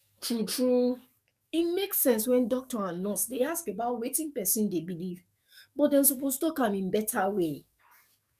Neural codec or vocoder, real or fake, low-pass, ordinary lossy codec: codec, 44.1 kHz, 7.8 kbps, DAC; fake; 14.4 kHz; none